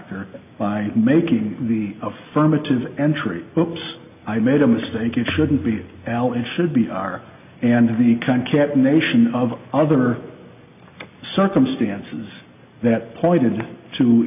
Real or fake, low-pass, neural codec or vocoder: real; 3.6 kHz; none